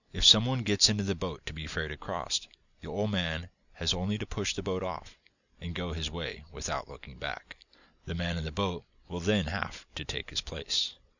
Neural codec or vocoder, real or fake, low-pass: none; real; 7.2 kHz